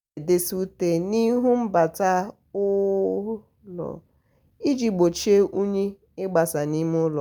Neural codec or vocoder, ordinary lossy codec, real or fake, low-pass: none; none; real; none